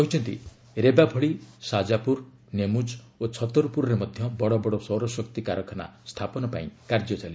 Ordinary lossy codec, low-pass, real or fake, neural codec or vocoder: none; none; real; none